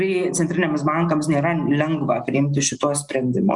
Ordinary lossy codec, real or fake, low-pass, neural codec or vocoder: Opus, 64 kbps; real; 10.8 kHz; none